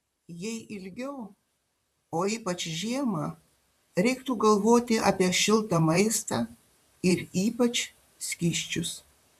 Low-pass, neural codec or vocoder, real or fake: 14.4 kHz; vocoder, 44.1 kHz, 128 mel bands, Pupu-Vocoder; fake